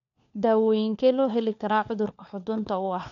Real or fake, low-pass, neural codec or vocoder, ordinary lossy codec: fake; 7.2 kHz; codec, 16 kHz, 4 kbps, FunCodec, trained on LibriTTS, 50 frames a second; none